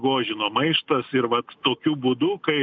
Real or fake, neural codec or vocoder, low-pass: real; none; 7.2 kHz